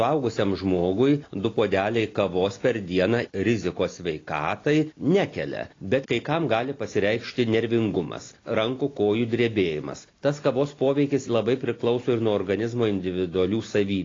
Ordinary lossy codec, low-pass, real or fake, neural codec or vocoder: AAC, 32 kbps; 7.2 kHz; real; none